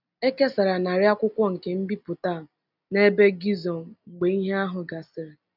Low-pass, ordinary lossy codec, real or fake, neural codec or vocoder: 5.4 kHz; none; real; none